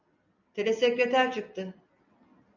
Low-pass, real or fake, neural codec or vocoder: 7.2 kHz; real; none